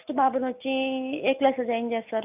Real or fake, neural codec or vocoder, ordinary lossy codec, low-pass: real; none; none; 3.6 kHz